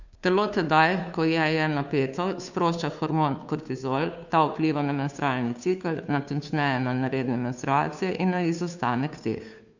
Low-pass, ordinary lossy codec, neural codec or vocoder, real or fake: 7.2 kHz; none; codec, 16 kHz, 2 kbps, FunCodec, trained on Chinese and English, 25 frames a second; fake